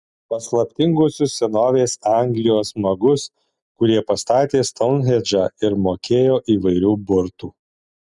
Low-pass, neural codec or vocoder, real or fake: 10.8 kHz; none; real